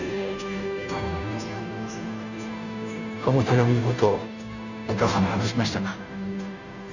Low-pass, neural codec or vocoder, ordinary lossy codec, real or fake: 7.2 kHz; codec, 16 kHz, 0.5 kbps, FunCodec, trained on Chinese and English, 25 frames a second; none; fake